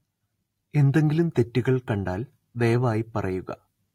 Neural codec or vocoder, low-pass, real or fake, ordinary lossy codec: none; 19.8 kHz; real; AAC, 48 kbps